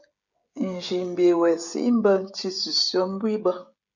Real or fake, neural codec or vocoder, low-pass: fake; codec, 16 kHz, 16 kbps, FreqCodec, smaller model; 7.2 kHz